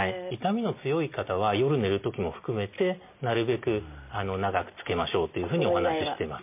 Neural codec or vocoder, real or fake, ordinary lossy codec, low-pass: none; real; MP3, 32 kbps; 3.6 kHz